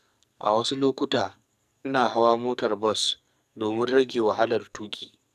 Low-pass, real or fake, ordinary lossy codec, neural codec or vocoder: 14.4 kHz; fake; none; codec, 44.1 kHz, 2.6 kbps, SNAC